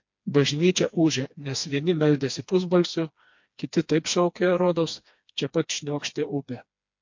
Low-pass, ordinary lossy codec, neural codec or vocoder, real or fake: 7.2 kHz; MP3, 48 kbps; codec, 16 kHz, 2 kbps, FreqCodec, smaller model; fake